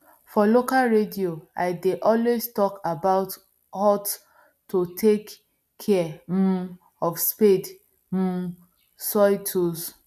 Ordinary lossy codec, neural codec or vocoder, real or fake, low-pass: none; none; real; 14.4 kHz